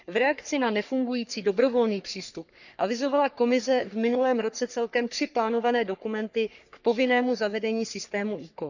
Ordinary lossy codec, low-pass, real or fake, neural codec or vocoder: none; 7.2 kHz; fake; codec, 44.1 kHz, 3.4 kbps, Pupu-Codec